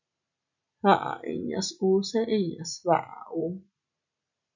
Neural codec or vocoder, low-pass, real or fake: none; 7.2 kHz; real